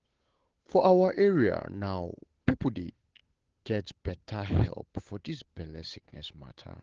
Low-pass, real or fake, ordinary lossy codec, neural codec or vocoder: 7.2 kHz; fake; Opus, 16 kbps; codec, 16 kHz, 8 kbps, FunCodec, trained on Chinese and English, 25 frames a second